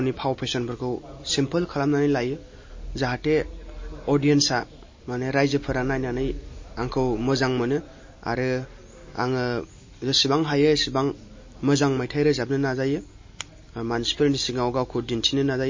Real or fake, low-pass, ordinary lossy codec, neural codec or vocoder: real; 7.2 kHz; MP3, 32 kbps; none